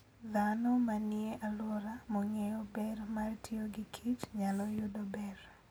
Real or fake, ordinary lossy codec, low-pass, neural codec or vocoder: real; none; none; none